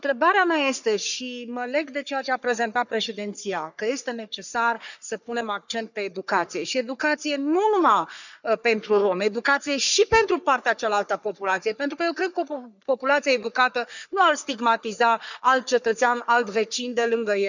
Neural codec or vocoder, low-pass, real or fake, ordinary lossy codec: codec, 44.1 kHz, 3.4 kbps, Pupu-Codec; 7.2 kHz; fake; none